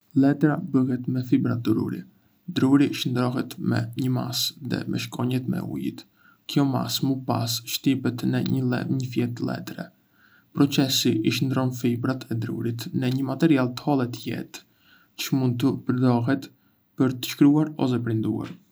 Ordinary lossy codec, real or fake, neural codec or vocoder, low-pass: none; real; none; none